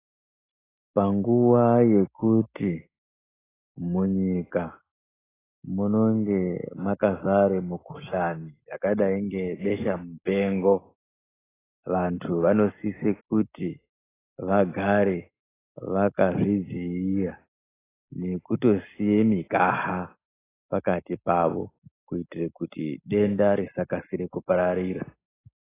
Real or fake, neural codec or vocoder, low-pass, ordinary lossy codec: real; none; 3.6 kHz; AAC, 16 kbps